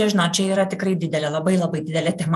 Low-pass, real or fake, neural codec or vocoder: 14.4 kHz; fake; vocoder, 48 kHz, 128 mel bands, Vocos